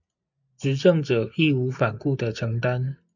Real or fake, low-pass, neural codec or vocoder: real; 7.2 kHz; none